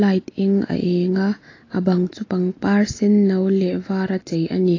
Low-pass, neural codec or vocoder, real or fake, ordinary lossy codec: 7.2 kHz; none; real; AAC, 32 kbps